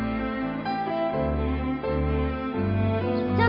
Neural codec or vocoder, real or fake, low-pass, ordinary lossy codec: none; real; 5.4 kHz; none